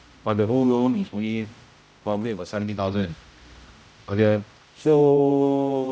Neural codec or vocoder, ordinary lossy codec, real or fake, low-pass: codec, 16 kHz, 0.5 kbps, X-Codec, HuBERT features, trained on general audio; none; fake; none